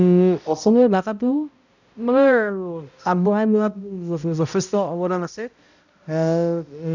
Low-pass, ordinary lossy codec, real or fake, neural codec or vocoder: 7.2 kHz; none; fake; codec, 16 kHz, 0.5 kbps, X-Codec, HuBERT features, trained on balanced general audio